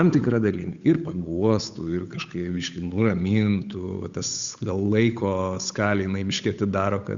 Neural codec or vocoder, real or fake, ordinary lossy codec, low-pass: codec, 16 kHz, 8 kbps, FunCodec, trained on Chinese and English, 25 frames a second; fake; Opus, 64 kbps; 7.2 kHz